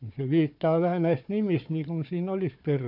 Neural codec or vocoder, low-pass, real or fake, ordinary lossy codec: codec, 16 kHz, 4 kbps, FunCodec, trained on Chinese and English, 50 frames a second; 5.4 kHz; fake; none